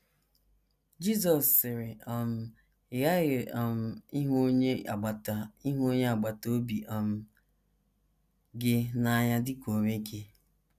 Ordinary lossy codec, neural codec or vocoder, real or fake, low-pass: none; none; real; 14.4 kHz